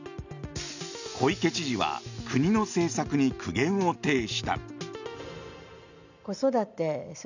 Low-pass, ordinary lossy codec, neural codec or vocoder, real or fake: 7.2 kHz; none; none; real